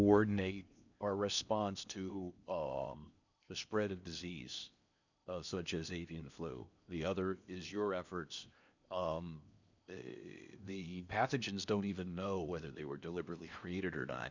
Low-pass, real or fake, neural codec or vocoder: 7.2 kHz; fake; codec, 16 kHz in and 24 kHz out, 0.8 kbps, FocalCodec, streaming, 65536 codes